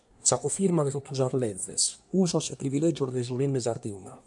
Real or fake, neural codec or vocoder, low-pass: fake; codec, 24 kHz, 1 kbps, SNAC; 10.8 kHz